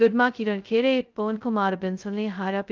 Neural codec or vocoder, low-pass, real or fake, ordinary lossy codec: codec, 16 kHz, 0.2 kbps, FocalCodec; 7.2 kHz; fake; Opus, 32 kbps